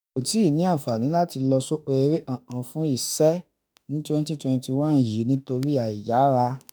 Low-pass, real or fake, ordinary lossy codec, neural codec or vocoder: none; fake; none; autoencoder, 48 kHz, 32 numbers a frame, DAC-VAE, trained on Japanese speech